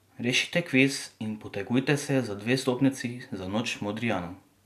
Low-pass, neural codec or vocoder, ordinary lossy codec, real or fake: 14.4 kHz; none; none; real